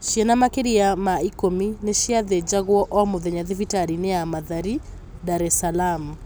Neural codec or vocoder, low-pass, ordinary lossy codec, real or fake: none; none; none; real